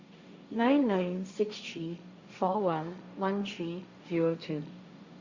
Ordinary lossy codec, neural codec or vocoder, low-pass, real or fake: Opus, 64 kbps; codec, 16 kHz, 1.1 kbps, Voila-Tokenizer; 7.2 kHz; fake